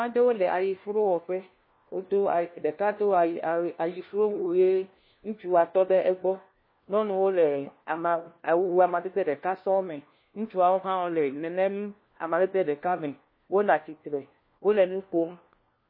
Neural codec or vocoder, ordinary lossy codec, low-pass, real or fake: codec, 16 kHz, 1 kbps, FunCodec, trained on LibriTTS, 50 frames a second; MP3, 24 kbps; 5.4 kHz; fake